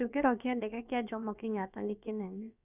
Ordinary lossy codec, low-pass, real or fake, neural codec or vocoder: none; 3.6 kHz; fake; codec, 16 kHz, about 1 kbps, DyCAST, with the encoder's durations